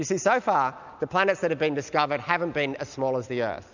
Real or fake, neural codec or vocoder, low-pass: real; none; 7.2 kHz